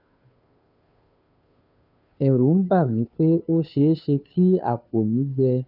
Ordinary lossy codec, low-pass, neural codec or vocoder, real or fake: none; 5.4 kHz; codec, 16 kHz, 2 kbps, FunCodec, trained on Chinese and English, 25 frames a second; fake